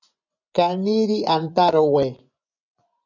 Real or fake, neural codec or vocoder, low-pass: fake; vocoder, 24 kHz, 100 mel bands, Vocos; 7.2 kHz